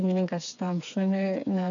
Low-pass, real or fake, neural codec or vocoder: 7.2 kHz; fake; codec, 16 kHz, 4 kbps, FreqCodec, smaller model